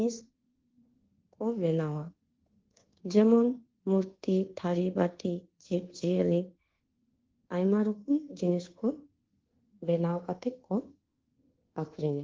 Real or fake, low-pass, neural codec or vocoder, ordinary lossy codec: fake; 7.2 kHz; codec, 24 kHz, 1.2 kbps, DualCodec; Opus, 16 kbps